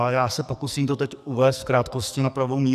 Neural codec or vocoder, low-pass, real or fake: codec, 44.1 kHz, 2.6 kbps, SNAC; 14.4 kHz; fake